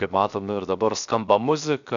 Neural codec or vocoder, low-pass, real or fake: codec, 16 kHz, 0.7 kbps, FocalCodec; 7.2 kHz; fake